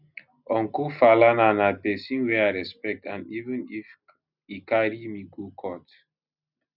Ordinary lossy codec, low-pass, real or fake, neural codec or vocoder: none; 5.4 kHz; real; none